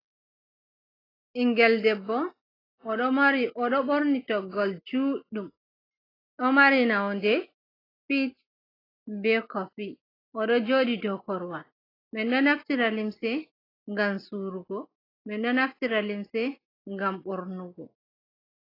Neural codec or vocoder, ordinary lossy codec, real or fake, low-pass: none; AAC, 24 kbps; real; 5.4 kHz